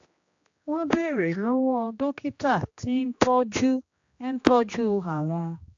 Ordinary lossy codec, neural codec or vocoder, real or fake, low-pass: AAC, 48 kbps; codec, 16 kHz, 1 kbps, X-Codec, HuBERT features, trained on general audio; fake; 7.2 kHz